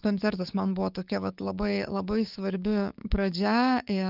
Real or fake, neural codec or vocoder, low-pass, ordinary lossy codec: fake; codec, 16 kHz, 6 kbps, DAC; 5.4 kHz; Opus, 24 kbps